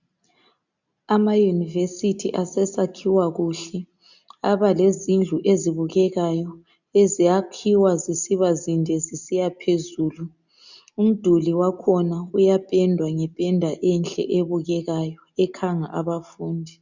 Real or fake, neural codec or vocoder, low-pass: real; none; 7.2 kHz